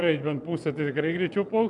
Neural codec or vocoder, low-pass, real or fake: vocoder, 24 kHz, 100 mel bands, Vocos; 10.8 kHz; fake